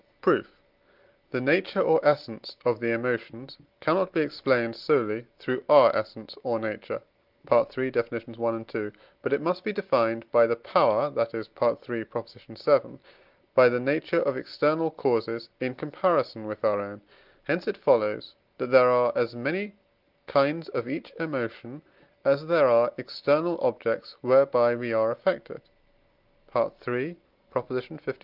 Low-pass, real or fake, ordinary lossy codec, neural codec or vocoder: 5.4 kHz; real; Opus, 32 kbps; none